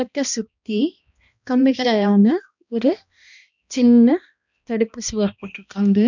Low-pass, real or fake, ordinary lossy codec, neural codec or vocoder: 7.2 kHz; fake; none; codec, 16 kHz, 1 kbps, X-Codec, HuBERT features, trained on balanced general audio